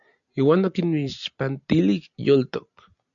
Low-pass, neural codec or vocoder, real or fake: 7.2 kHz; none; real